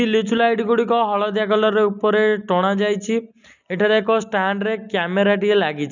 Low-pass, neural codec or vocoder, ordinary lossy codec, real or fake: 7.2 kHz; none; none; real